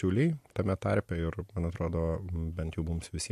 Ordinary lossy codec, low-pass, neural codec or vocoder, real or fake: MP3, 64 kbps; 14.4 kHz; none; real